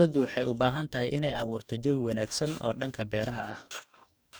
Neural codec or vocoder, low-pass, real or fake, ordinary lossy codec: codec, 44.1 kHz, 2.6 kbps, DAC; none; fake; none